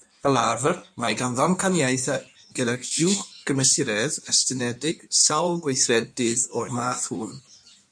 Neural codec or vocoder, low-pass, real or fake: codec, 16 kHz in and 24 kHz out, 1.1 kbps, FireRedTTS-2 codec; 9.9 kHz; fake